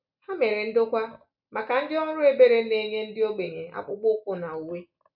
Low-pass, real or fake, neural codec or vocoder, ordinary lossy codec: 5.4 kHz; real; none; none